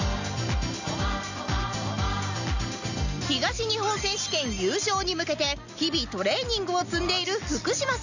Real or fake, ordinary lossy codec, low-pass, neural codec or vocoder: real; none; 7.2 kHz; none